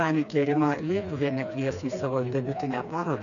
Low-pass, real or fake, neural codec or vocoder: 7.2 kHz; fake; codec, 16 kHz, 2 kbps, FreqCodec, smaller model